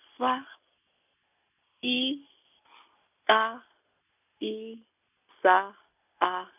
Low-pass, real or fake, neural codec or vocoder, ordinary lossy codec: 3.6 kHz; real; none; none